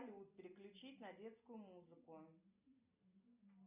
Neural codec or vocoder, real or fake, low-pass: none; real; 3.6 kHz